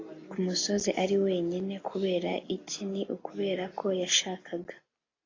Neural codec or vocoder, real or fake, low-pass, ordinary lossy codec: none; real; 7.2 kHz; AAC, 32 kbps